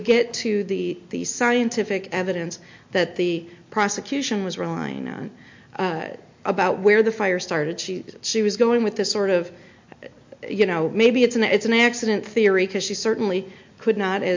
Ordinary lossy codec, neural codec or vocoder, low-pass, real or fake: MP3, 48 kbps; none; 7.2 kHz; real